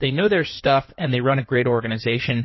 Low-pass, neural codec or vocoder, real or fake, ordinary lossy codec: 7.2 kHz; codec, 16 kHz in and 24 kHz out, 2.2 kbps, FireRedTTS-2 codec; fake; MP3, 24 kbps